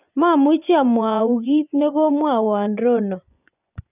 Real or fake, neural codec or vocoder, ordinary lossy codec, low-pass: fake; vocoder, 24 kHz, 100 mel bands, Vocos; none; 3.6 kHz